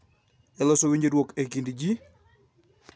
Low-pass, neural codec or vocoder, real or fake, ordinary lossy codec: none; none; real; none